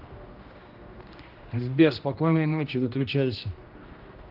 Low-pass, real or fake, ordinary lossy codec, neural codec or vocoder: 5.4 kHz; fake; Opus, 32 kbps; codec, 16 kHz, 1 kbps, X-Codec, HuBERT features, trained on general audio